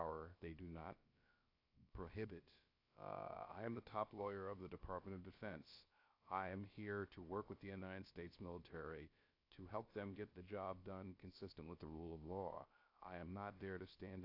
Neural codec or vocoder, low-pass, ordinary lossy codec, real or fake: codec, 16 kHz, about 1 kbps, DyCAST, with the encoder's durations; 5.4 kHz; AAC, 32 kbps; fake